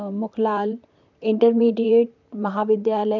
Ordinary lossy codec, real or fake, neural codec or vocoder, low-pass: none; fake; vocoder, 44.1 kHz, 128 mel bands, Pupu-Vocoder; 7.2 kHz